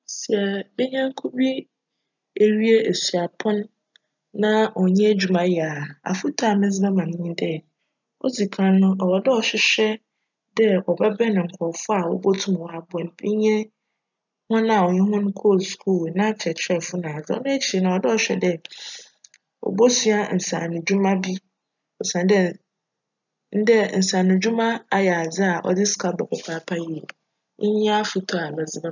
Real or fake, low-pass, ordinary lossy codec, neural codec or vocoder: real; 7.2 kHz; none; none